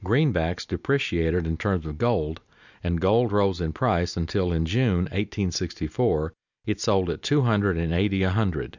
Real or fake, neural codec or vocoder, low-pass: real; none; 7.2 kHz